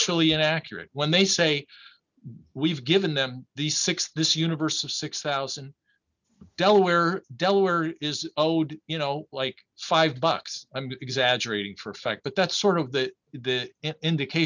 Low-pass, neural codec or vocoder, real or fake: 7.2 kHz; none; real